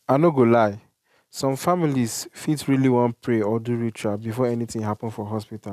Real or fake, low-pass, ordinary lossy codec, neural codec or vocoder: real; 14.4 kHz; none; none